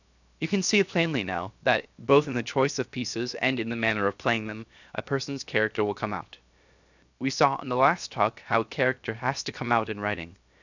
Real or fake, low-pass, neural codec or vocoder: fake; 7.2 kHz; codec, 16 kHz, 0.7 kbps, FocalCodec